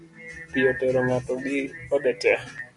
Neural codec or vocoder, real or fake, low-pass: none; real; 10.8 kHz